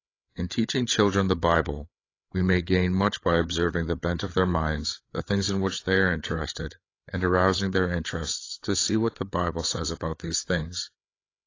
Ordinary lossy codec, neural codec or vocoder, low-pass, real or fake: AAC, 32 kbps; codec, 16 kHz, 8 kbps, FreqCodec, larger model; 7.2 kHz; fake